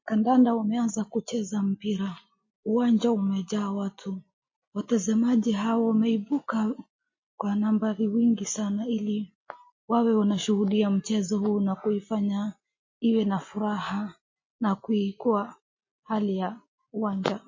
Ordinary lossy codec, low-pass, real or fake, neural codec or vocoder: MP3, 32 kbps; 7.2 kHz; real; none